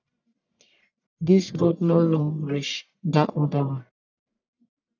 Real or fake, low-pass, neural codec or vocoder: fake; 7.2 kHz; codec, 44.1 kHz, 1.7 kbps, Pupu-Codec